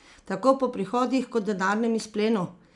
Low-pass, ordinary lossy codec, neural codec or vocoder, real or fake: 10.8 kHz; none; none; real